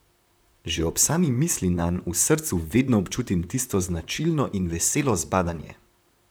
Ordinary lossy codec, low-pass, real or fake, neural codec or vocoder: none; none; fake; vocoder, 44.1 kHz, 128 mel bands, Pupu-Vocoder